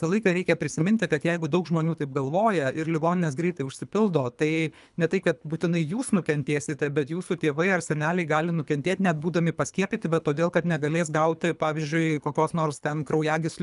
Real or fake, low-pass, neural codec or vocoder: fake; 10.8 kHz; codec, 24 kHz, 3 kbps, HILCodec